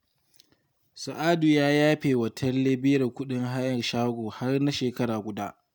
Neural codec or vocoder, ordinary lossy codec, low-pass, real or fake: none; none; none; real